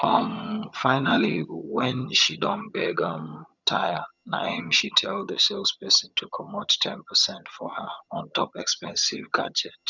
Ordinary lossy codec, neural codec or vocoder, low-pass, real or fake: none; vocoder, 22.05 kHz, 80 mel bands, HiFi-GAN; 7.2 kHz; fake